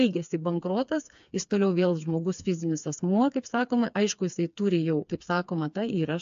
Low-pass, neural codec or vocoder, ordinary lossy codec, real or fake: 7.2 kHz; codec, 16 kHz, 4 kbps, FreqCodec, smaller model; AAC, 64 kbps; fake